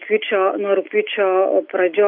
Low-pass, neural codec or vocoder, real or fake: 5.4 kHz; none; real